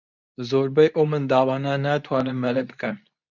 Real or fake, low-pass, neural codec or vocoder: fake; 7.2 kHz; codec, 24 kHz, 0.9 kbps, WavTokenizer, medium speech release version 2